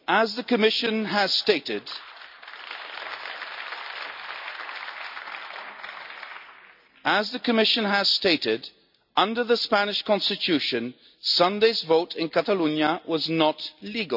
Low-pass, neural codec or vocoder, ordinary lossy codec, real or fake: 5.4 kHz; none; none; real